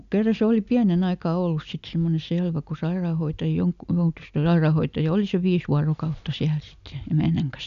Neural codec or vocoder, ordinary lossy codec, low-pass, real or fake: none; none; 7.2 kHz; real